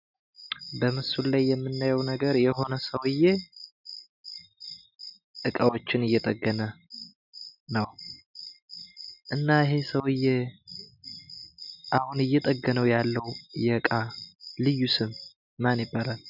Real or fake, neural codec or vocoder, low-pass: real; none; 5.4 kHz